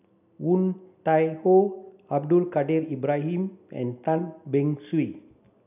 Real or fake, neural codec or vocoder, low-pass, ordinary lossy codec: real; none; 3.6 kHz; none